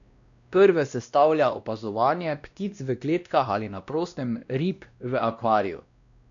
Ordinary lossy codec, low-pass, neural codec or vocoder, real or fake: none; 7.2 kHz; codec, 16 kHz, 1 kbps, X-Codec, WavLM features, trained on Multilingual LibriSpeech; fake